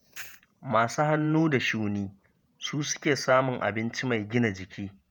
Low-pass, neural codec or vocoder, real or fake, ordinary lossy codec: none; vocoder, 48 kHz, 128 mel bands, Vocos; fake; none